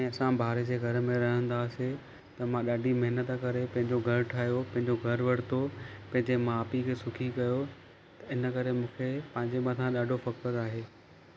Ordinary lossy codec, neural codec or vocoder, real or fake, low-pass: none; none; real; none